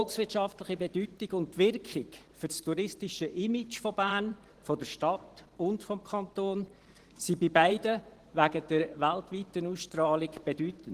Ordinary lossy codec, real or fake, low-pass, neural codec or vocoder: Opus, 16 kbps; fake; 14.4 kHz; vocoder, 44.1 kHz, 128 mel bands every 512 samples, BigVGAN v2